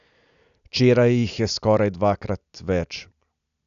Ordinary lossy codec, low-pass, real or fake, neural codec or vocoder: none; 7.2 kHz; real; none